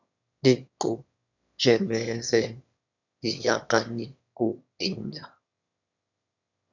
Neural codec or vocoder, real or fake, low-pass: autoencoder, 22.05 kHz, a latent of 192 numbers a frame, VITS, trained on one speaker; fake; 7.2 kHz